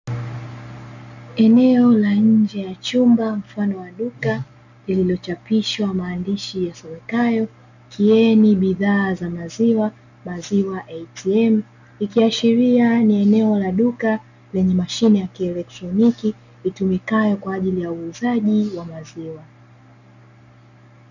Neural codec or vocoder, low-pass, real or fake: none; 7.2 kHz; real